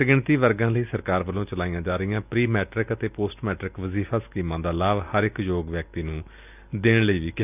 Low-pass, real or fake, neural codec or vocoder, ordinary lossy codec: 3.6 kHz; real; none; none